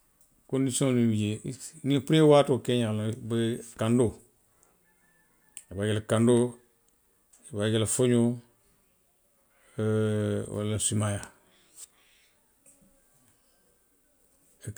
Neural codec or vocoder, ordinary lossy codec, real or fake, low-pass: none; none; real; none